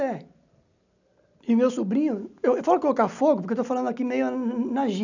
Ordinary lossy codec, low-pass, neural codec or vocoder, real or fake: none; 7.2 kHz; none; real